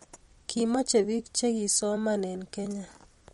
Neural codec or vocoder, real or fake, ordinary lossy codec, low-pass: vocoder, 44.1 kHz, 128 mel bands every 256 samples, BigVGAN v2; fake; MP3, 48 kbps; 19.8 kHz